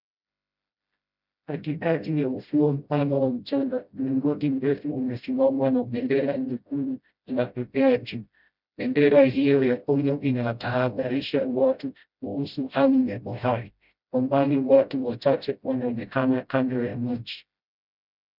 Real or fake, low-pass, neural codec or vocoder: fake; 5.4 kHz; codec, 16 kHz, 0.5 kbps, FreqCodec, smaller model